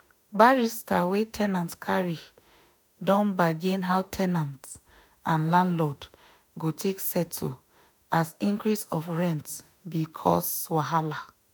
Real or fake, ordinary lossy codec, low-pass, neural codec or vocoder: fake; none; none; autoencoder, 48 kHz, 32 numbers a frame, DAC-VAE, trained on Japanese speech